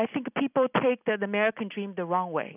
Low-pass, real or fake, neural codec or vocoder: 3.6 kHz; real; none